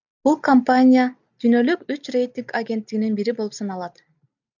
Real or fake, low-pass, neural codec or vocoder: real; 7.2 kHz; none